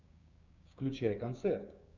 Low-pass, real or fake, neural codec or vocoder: 7.2 kHz; fake; codec, 16 kHz, 6 kbps, DAC